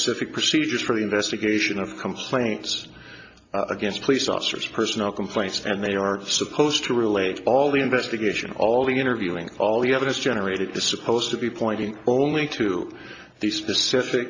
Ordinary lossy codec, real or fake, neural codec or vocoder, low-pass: AAC, 48 kbps; real; none; 7.2 kHz